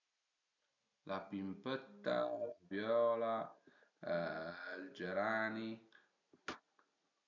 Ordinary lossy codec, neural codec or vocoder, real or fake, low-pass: none; none; real; 7.2 kHz